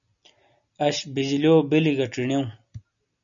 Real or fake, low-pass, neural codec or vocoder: real; 7.2 kHz; none